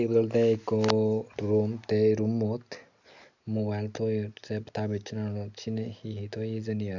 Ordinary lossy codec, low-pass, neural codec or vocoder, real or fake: none; 7.2 kHz; none; real